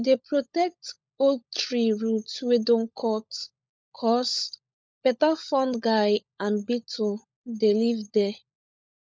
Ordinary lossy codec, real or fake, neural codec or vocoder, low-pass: none; fake; codec, 16 kHz, 16 kbps, FunCodec, trained on LibriTTS, 50 frames a second; none